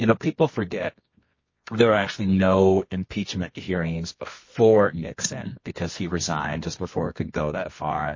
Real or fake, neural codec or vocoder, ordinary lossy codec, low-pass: fake; codec, 24 kHz, 0.9 kbps, WavTokenizer, medium music audio release; MP3, 32 kbps; 7.2 kHz